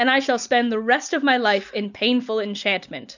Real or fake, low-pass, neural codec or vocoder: real; 7.2 kHz; none